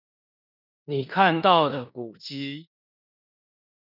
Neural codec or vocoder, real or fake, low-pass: codec, 16 kHz in and 24 kHz out, 0.9 kbps, LongCat-Audio-Codec, four codebook decoder; fake; 5.4 kHz